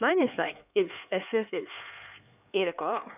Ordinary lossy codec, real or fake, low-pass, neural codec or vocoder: none; fake; 3.6 kHz; codec, 16 kHz, 4 kbps, X-Codec, HuBERT features, trained on LibriSpeech